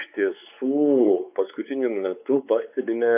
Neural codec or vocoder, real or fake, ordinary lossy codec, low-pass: codec, 16 kHz, 4 kbps, X-Codec, WavLM features, trained on Multilingual LibriSpeech; fake; AAC, 32 kbps; 3.6 kHz